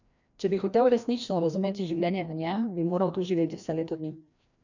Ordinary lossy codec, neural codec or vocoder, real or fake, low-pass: none; codec, 16 kHz, 1 kbps, FreqCodec, larger model; fake; 7.2 kHz